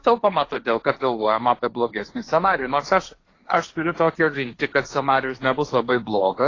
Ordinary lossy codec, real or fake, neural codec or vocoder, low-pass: AAC, 32 kbps; fake; codec, 16 kHz, 1.1 kbps, Voila-Tokenizer; 7.2 kHz